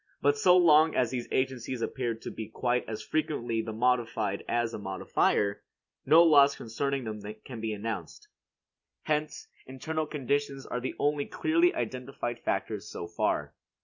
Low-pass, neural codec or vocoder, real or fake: 7.2 kHz; none; real